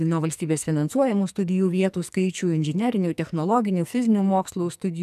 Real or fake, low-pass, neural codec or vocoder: fake; 14.4 kHz; codec, 44.1 kHz, 2.6 kbps, SNAC